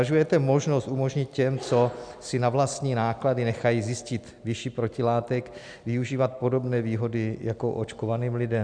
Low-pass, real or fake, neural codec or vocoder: 9.9 kHz; real; none